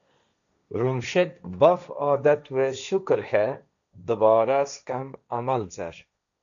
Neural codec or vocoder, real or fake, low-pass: codec, 16 kHz, 1.1 kbps, Voila-Tokenizer; fake; 7.2 kHz